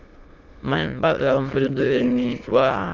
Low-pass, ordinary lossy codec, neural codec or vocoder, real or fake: 7.2 kHz; Opus, 32 kbps; autoencoder, 22.05 kHz, a latent of 192 numbers a frame, VITS, trained on many speakers; fake